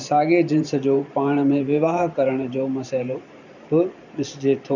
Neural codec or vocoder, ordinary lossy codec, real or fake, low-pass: vocoder, 44.1 kHz, 128 mel bands every 512 samples, BigVGAN v2; none; fake; 7.2 kHz